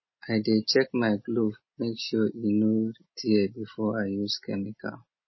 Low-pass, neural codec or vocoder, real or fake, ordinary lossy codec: 7.2 kHz; none; real; MP3, 24 kbps